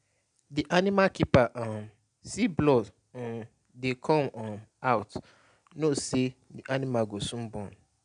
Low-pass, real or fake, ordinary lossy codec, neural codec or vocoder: 9.9 kHz; real; none; none